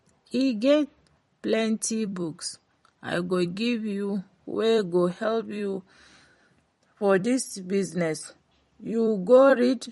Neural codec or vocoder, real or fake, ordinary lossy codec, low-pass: vocoder, 44.1 kHz, 128 mel bands every 256 samples, BigVGAN v2; fake; MP3, 48 kbps; 19.8 kHz